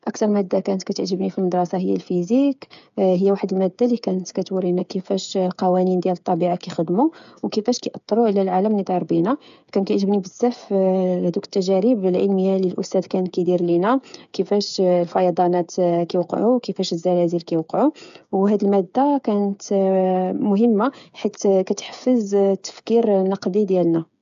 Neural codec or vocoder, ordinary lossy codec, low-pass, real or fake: codec, 16 kHz, 8 kbps, FreqCodec, smaller model; AAC, 96 kbps; 7.2 kHz; fake